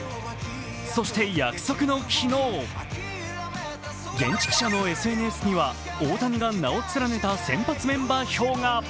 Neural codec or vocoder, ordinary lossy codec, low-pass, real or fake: none; none; none; real